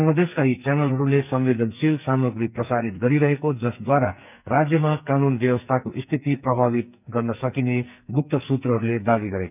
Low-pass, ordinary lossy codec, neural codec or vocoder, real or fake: 3.6 kHz; none; codec, 44.1 kHz, 2.6 kbps, SNAC; fake